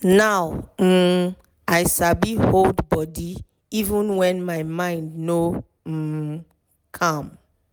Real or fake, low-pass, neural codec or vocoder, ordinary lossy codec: real; none; none; none